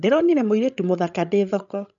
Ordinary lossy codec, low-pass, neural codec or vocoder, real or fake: none; 7.2 kHz; codec, 16 kHz, 16 kbps, FreqCodec, larger model; fake